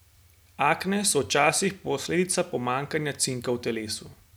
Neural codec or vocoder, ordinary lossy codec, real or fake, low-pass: none; none; real; none